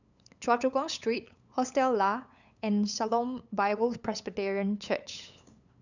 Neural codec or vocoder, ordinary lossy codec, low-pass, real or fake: codec, 16 kHz, 8 kbps, FunCodec, trained on LibriTTS, 25 frames a second; none; 7.2 kHz; fake